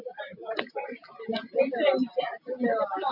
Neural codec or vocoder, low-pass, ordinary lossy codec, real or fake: none; 5.4 kHz; MP3, 48 kbps; real